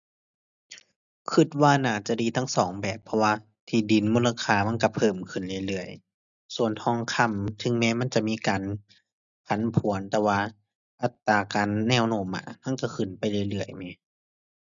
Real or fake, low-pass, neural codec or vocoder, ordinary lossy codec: real; 7.2 kHz; none; none